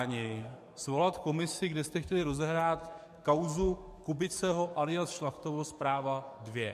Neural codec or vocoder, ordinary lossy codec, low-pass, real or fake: codec, 44.1 kHz, 7.8 kbps, DAC; MP3, 64 kbps; 14.4 kHz; fake